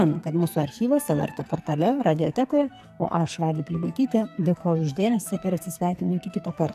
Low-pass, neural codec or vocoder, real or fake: 14.4 kHz; codec, 44.1 kHz, 2.6 kbps, SNAC; fake